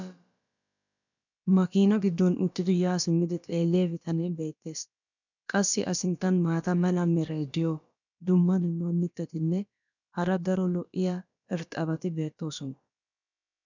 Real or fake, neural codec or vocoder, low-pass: fake; codec, 16 kHz, about 1 kbps, DyCAST, with the encoder's durations; 7.2 kHz